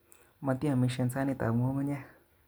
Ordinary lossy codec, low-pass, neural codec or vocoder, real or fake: none; none; none; real